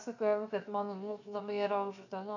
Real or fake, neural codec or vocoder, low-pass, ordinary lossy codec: fake; codec, 16 kHz, 0.7 kbps, FocalCodec; 7.2 kHz; AAC, 48 kbps